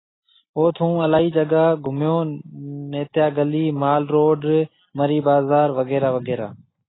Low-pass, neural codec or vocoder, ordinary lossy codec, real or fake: 7.2 kHz; none; AAC, 16 kbps; real